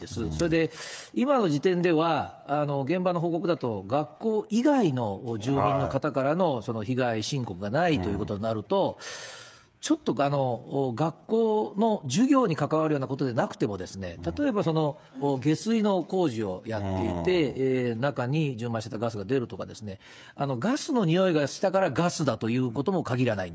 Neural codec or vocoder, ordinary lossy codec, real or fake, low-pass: codec, 16 kHz, 8 kbps, FreqCodec, smaller model; none; fake; none